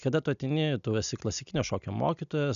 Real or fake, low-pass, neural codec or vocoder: real; 7.2 kHz; none